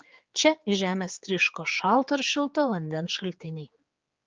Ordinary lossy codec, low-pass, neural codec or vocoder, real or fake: Opus, 24 kbps; 7.2 kHz; codec, 16 kHz, 4 kbps, X-Codec, HuBERT features, trained on general audio; fake